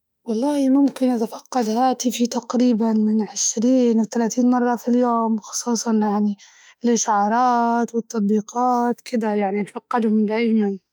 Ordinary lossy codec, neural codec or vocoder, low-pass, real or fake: none; autoencoder, 48 kHz, 32 numbers a frame, DAC-VAE, trained on Japanese speech; none; fake